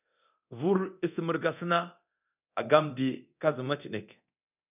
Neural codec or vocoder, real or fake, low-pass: codec, 24 kHz, 0.9 kbps, DualCodec; fake; 3.6 kHz